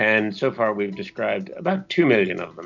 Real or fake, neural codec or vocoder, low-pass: real; none; 7.2 kHz